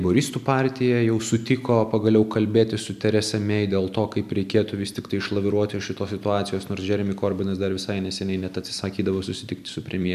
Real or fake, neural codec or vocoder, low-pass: real; none; 14.4 kHz